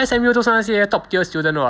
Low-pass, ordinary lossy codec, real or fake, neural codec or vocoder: none; none; real; none